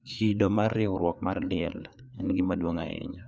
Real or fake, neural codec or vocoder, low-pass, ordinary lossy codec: fake; codec, 16 kHz, 4 kbps, FreqCodec, larger model; none; none